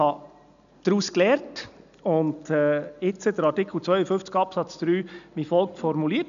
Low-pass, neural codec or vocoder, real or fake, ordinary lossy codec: 7.2 kHz; none; real; none